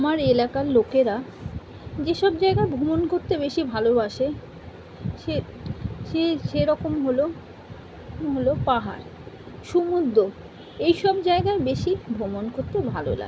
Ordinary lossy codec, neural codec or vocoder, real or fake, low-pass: none; none; real; none